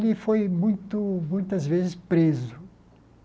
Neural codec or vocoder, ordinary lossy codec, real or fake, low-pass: none; none; real; none